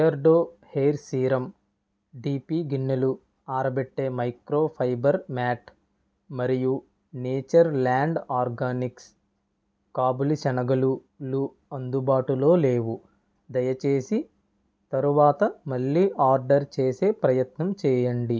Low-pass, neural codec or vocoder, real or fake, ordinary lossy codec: none; none; real; none